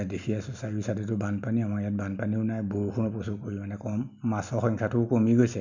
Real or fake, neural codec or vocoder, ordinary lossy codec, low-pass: real; none; none; 7.2 kHz